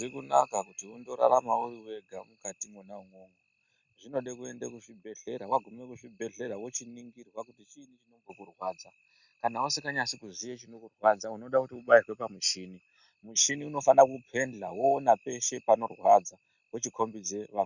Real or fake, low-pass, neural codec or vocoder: real; 7.2 kHz; none